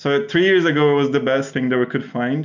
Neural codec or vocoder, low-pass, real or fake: none; 7.2 kHz; real